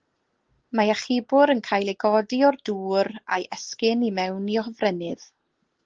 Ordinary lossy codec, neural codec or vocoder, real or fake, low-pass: Opus, 16 kbps; none; real; 7.2 kHz